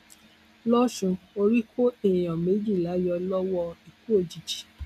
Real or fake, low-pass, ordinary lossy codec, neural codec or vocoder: real; 14.4 kHz; none; none